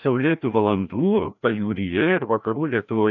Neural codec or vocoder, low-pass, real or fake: codec, 16 kHz, 1 kbps, FreqCodec, larger model; 7.2 kHz; fake